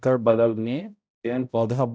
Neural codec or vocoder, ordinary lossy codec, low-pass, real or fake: codec, 16 kHz, 0.5 kbps, X-Codec, HuBERT features, trained on balanced general audio; none; none; fake